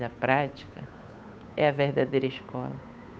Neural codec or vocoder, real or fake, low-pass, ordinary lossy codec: codec, 16 kHz, 8 kbps, FunCodec, trained on Chinese and English, 25 frames a second; fake; none; none